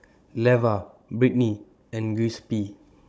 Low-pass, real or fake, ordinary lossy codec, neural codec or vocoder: none; fake; none; codec, 16 kHz, 16 kbps, FunCodec, trained on Chinese and English, 50 frames a second